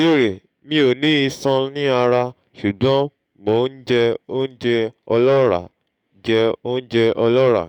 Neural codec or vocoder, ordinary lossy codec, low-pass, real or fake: codec, 44.1 kHz, 7.8 kbps, DAC; none; 19.8 kHz; fake